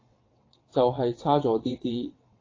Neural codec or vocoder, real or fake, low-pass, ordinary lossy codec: vocoder, 22.05 kHz, 80 mel bands, WaveNeXt; fake; 7.2 kHz; AAC, 32 kbps